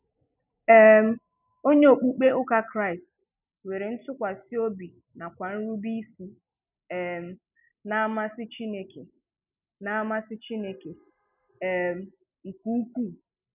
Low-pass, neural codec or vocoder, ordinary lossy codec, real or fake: 3.6 kHz; none; none; real